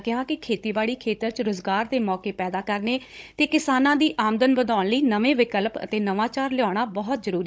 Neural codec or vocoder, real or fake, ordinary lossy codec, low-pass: codec, 16 kHz, 16 kbps, FunCodec, trained on Chinese and English, 50 frames a second; fake; none; none